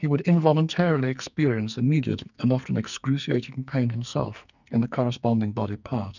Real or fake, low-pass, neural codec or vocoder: fake; 7.2 kHz; codec, 44.1 kHz, 2.6 kbps, SNAC